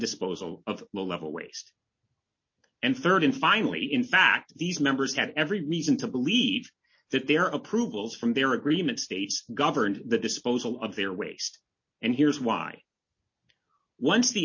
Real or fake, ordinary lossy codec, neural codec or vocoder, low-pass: real; MP3, 32 kbps; none; 7.2 kHz